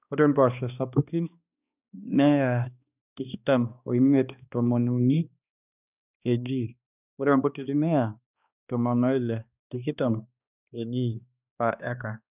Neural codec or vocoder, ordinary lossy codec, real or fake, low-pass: codec, 16 kHz, 2 kbps, X-Codec, HuBERT features, trained on balanced general audio; none; fake; 3.6 kHz